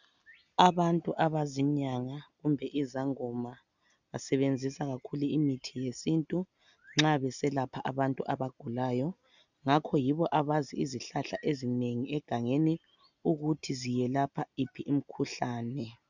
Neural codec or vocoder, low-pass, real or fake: none; 7.2 kHz; real